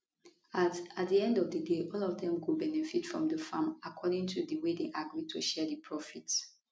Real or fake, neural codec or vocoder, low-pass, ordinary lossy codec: real; none; none; none